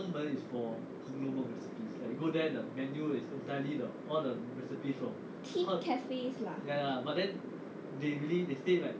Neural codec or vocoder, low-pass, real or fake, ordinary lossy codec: none; none; real; none